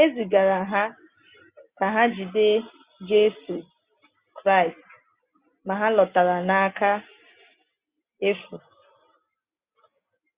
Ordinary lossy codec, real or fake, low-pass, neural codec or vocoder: Opus, 64 kbps; real; 3.6 kHz; none